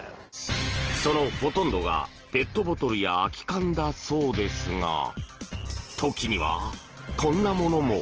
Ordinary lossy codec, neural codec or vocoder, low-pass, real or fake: Opus, 16 kbps; none; 7.2 kHz; real